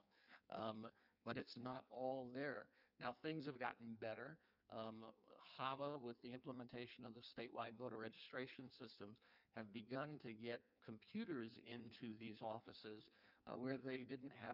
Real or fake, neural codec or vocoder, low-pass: fake; codec, 16 kHz in and 24 kHz out, 1.1 kbps, FireRedTTS-2 codec; 5.4 kHz